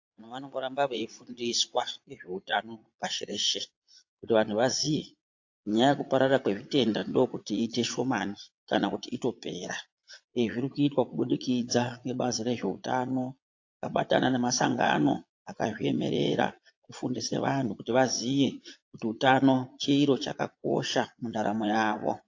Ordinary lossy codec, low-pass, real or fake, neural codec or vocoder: AAC, 48 kbps; 7.2 kHz; fake; vocoder, 22.05 kHz, 80 mel bands, Vocos